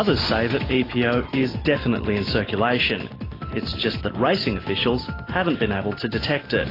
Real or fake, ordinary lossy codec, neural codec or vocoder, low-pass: real; AAC, 24 kbps; none; 5.4 kHz